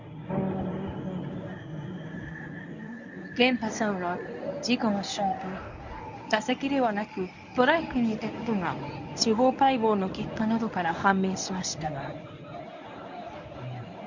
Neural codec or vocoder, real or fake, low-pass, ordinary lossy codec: codec, 24 kHz, 0.9 kbps, WavTokenizer, medium speech release version 1; fake; 7.2 kHz; none